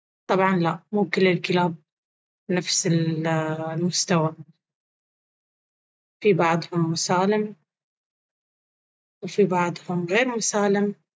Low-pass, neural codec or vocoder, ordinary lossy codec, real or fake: none; none; none; real